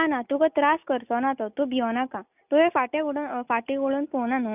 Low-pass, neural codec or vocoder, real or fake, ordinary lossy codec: 3.6 kHz; none; real; none